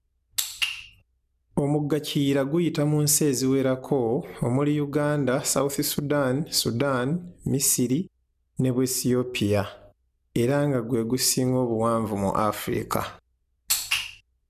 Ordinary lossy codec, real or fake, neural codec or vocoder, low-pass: none; real; none; 14.4 kHz